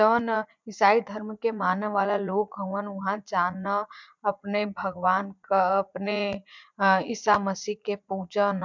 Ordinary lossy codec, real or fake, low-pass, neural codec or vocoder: MP3, 64 kbps; fake; 7.2 kHz; vocoder, 44.1 kHz, 80 mel bands, Vocos